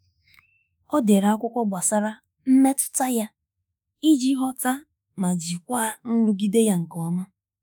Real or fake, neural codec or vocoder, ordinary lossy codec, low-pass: fake; autoencoder, 48 kHz, 32 numbers a frame, DAC-VAE, trained on Japanese speech; none; none